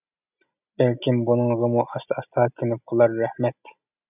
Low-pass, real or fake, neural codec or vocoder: 3.6 kHz; real; none